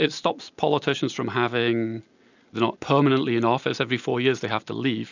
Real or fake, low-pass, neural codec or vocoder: real; 7.2 kHz; none